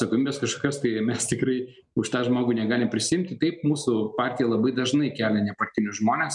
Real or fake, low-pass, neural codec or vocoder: real; 10.8 kHz; none